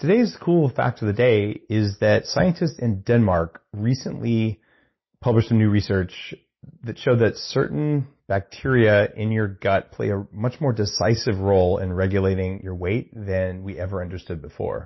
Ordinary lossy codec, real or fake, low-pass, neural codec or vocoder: MP3, 24 kbps; real; 7.2 kHz; none